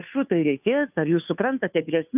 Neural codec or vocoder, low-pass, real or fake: codec, 16 kHz, 2 kbps, FunCodec, trained on Chinese and English, 25 frames a second; 3.6 kHz; fake